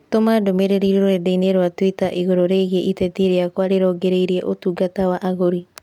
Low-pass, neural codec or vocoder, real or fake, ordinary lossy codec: 19.8 kHz; none; real; none